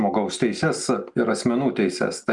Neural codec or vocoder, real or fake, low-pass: none; real; 10.8 kHz